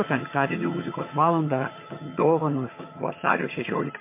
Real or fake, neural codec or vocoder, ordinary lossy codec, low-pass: fake; vocoder, 22.05 kHz, 80 mel bands, HiFi-GAN; MP3, 24 kbps; 3.6 kHz